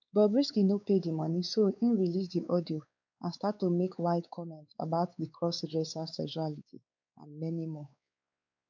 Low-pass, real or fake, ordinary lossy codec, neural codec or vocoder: 7.2 kHz; fake; none; codec, 16 kHz, 4 kbps, X-Codec, WavLM features, trained on Multilingual LibriSpeech